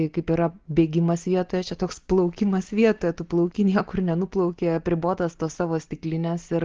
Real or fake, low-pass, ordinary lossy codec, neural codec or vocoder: real; 7.2 kHz; Opus, 16 kbps; none